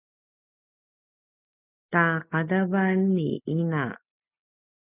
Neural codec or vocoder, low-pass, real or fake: none; 3.6 kHz; real